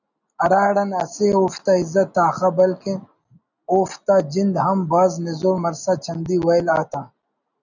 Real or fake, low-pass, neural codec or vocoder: real; 7.2 kHz; none